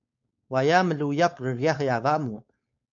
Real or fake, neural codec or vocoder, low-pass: fake; codec, 16 kHz, 4.8 kbps, FACodec; 7.2 kHz